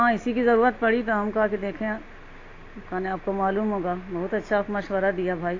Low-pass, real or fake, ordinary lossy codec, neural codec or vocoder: 7.2 kHz; real; AAC, 32 kbps; none